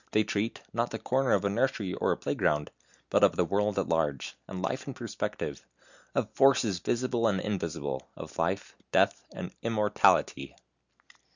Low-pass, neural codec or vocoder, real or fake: 7.2 kHz; none; real